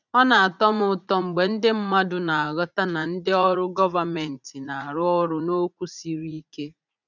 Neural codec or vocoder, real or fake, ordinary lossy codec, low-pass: vocoder, 44.1 kHz, 80 mel bands, Vocos; fake; none; 7.2 kHz